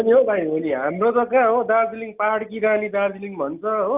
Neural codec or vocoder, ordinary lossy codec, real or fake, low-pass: none; Opus, 24 kbps; real; 3.6 kHz